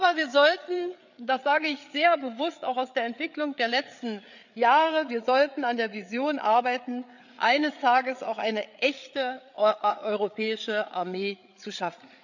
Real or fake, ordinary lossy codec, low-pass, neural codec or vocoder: fake; none; 7.2 kHz; codec, 16 kHz, 8 kbps, FreqCodec, larger model